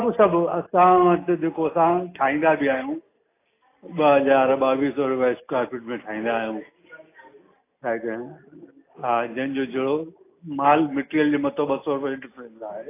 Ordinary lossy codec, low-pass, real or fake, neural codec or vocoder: AAC, 24 kbps; 3.6 kHz; real; none